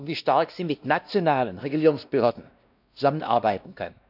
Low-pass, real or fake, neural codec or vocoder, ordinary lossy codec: 5.4 kHz; fake; codec, 16 kHz, 1 kbps, X-Codec, WavLM features, trained on Multilingual LibriSpeech; none